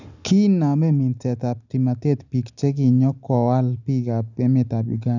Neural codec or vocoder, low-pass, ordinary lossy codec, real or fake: none; 7.2 kHz; none; real